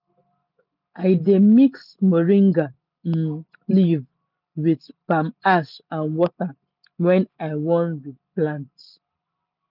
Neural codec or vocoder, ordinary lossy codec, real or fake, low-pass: none; none; real; 5.4 kHz